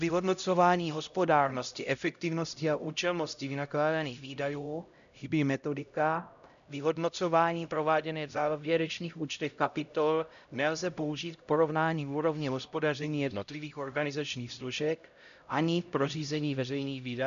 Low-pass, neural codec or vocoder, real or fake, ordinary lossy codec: 7.2 kHz; codec, 16 kHz, 0.5 kbps, X-Codec, HuBERT features, trained on LibriSpeech; fake; MP3, 96 kbps